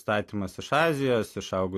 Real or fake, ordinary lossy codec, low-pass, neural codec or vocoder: real; AAC, 64 kbps; 14.4 kHz; none